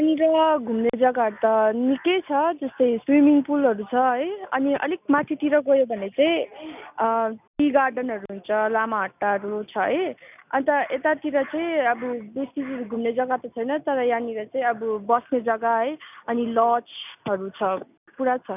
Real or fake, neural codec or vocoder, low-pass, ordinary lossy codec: real; none; 3.6 kHz; none